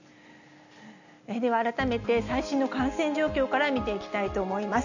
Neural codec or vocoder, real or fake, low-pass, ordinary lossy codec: none; real; 7.2 kHz; none